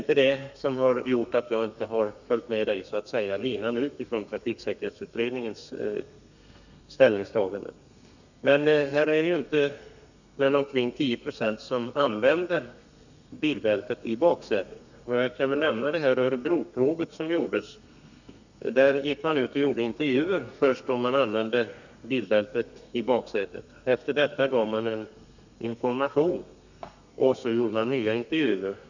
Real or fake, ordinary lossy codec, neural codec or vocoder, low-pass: fake; none; codec, 32 kHz, 1.9 kbps, SNAC; 7.2 kHz